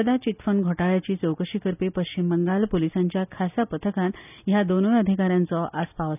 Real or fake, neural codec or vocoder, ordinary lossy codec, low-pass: real; none; none; 3.6 kHz